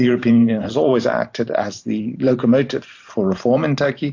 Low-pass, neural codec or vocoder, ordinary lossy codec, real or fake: 7.2 kHz; vocoder, 44.1 kHz, 128 mel bands every 512 samples, BigVGAN v2; AAC, 48 kbps; fake